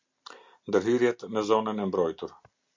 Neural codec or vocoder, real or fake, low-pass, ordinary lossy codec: none; real; 7.2 kHz; MP3, 64 kbps